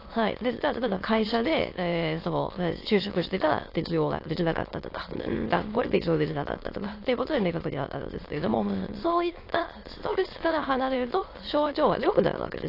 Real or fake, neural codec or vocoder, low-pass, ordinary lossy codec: fake; autoencoder, 22.05 kHz, a latent of 192 numbers a frame, VITS, trained on many speakers; 5.4 kHz; AAC, 32 kbps